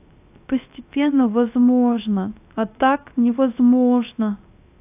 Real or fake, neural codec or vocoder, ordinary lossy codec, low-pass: fake; codec, 16 kHz, 0.3 kbps, FocalCodec; AAC, 32 kbps; 3.6 kHz